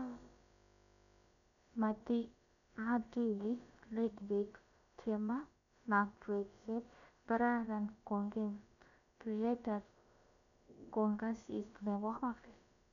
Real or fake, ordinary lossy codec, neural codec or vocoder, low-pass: fake; none; codec, 16 kHz, about 1 kbps, DyCAST, with the encoder's durations; 7.2 kHz